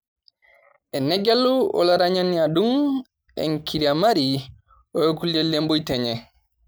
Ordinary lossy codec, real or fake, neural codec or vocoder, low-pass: none; real; none; none